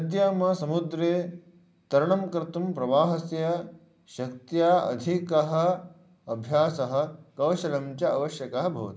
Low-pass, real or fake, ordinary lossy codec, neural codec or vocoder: none; real; none; none